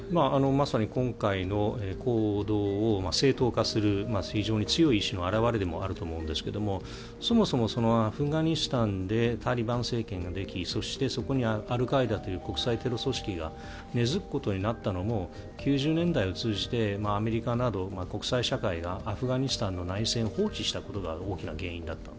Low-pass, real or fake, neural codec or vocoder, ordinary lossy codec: none; real; none; none